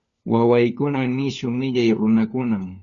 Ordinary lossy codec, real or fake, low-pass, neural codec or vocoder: Opus, 64 kbps; fake; 7.2 kHz; codec, 16 kHz, 4 kbps, FunCodec, trained on LibriTTS, 50 frames a second